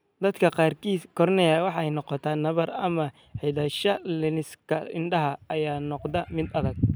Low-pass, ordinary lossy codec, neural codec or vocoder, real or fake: none; none; none; real